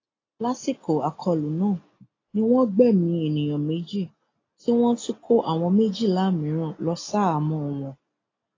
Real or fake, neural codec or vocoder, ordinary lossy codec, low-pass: real; none; AAC, 32 kbps; 7.2 kHz